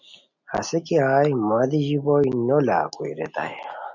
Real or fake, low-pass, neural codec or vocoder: real; 7.2 kHz; none